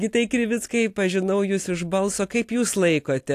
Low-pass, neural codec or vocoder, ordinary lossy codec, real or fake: 14.4 kHz; none; AAC, 64 kbps; real